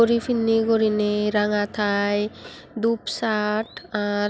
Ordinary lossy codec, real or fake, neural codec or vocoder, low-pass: none; real; none; none